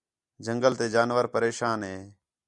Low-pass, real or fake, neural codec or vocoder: 10.8 kHz; real; none